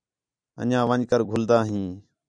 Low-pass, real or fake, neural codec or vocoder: 9.9 kHz; fake; vocoder, 44.1 kHz, 128 mel bands every 256 samples, BigVGAN v2